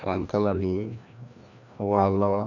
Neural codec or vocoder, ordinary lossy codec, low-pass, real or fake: codec, 16 kHz, 1 kbps, FreqCodec, larger model; none; 7.2 kHz; fake